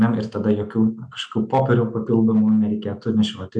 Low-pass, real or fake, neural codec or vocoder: 9.9 kHz; real; none